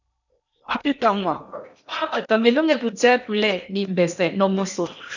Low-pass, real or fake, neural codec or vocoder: 7.2 kHz; fake; codec, 16 kHz in and 24 kHz out, 0.8 kbps, FocalCodec, streaming, 65536 codes